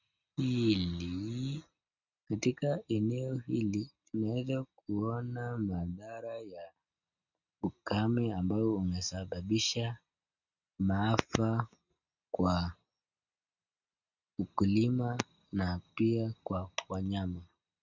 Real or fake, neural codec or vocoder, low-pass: real; none; 7.2 kHz